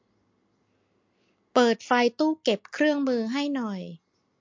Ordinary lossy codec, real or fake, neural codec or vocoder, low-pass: MP3, 48 kbps; real; none; 7.2 kHz